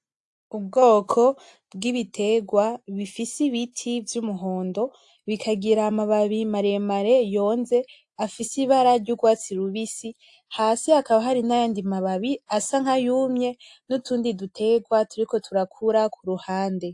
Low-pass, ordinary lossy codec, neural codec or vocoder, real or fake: 10.8 kHz; AAC, 64 kbps; none; real